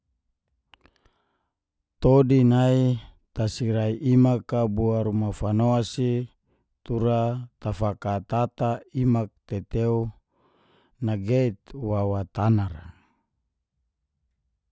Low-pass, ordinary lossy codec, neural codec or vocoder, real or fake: none; none; none; real